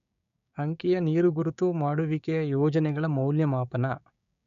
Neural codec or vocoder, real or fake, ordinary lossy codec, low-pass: codec, 16 kHz, 6 kbps, DAC; fake; none; 7.2 kHz